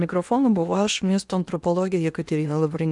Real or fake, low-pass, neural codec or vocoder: fake; 10.8 kHz; codec, 16 kHz in and 24 kHz out, 0.8 kbps, FocalCodec, streaming, 65536 codes